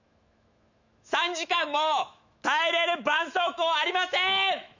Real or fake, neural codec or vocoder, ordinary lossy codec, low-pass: fake; autoencoder, 48 kHz, 128 numbers a frame, DAC-VAE, trained on Japanese speech; none; 7.2 kHz